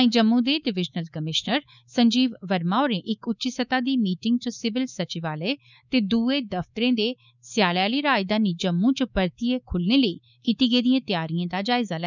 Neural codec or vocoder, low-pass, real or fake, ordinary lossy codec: codec, 24 kHz, 3.1 kbps, DualCodec; 7.2 kHz; fake; none